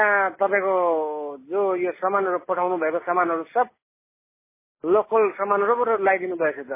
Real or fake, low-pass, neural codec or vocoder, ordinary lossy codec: real; 3.6 kHz; none; MP3, 16 kbps